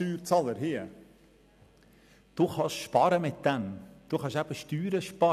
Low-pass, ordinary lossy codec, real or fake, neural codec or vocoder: 14.4 kHz; none; real; none